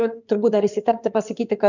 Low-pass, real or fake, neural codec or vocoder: 7.2 kHz; fake; codec, 16 kHz in and 24 kHz out, 2.2 kbps, FireRedTTS-2 codec